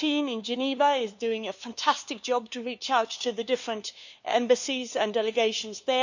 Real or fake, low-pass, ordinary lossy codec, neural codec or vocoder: fake; 7.2 kHz; none; codec, 16 kHz, 2 kbps, FunCodec, trained on LibriTTS, 25 frames a second